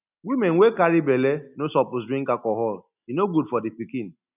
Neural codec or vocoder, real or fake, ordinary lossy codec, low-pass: none; real; none; 3.6 kHz